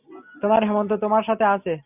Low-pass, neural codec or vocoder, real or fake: 3.6 kHz; none; real